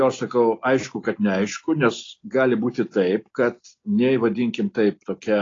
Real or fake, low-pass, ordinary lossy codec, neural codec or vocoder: real; 7.2 kHz; AAC, 32 kbps; none